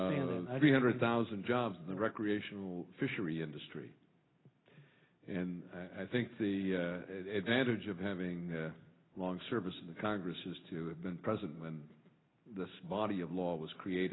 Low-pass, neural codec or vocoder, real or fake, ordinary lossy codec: 7.2 kHz; none; real; AAC, 16 kbps